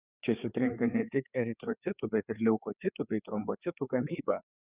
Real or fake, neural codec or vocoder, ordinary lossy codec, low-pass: fake; codec, 16 kHz, 4 kbps, FreqCodec, larger model; Opus, 64 kbps; 3.6 kHz